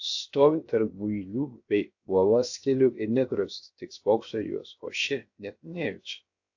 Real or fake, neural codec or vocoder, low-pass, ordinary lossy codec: fake; codec, 16 kHz, 0.7 kbps, FocalCodec; 7.2 kHz; AAC, 48 kbps